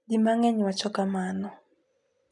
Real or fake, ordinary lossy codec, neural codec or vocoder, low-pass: real; MP3, 96 kbps; none; 10.8 kHz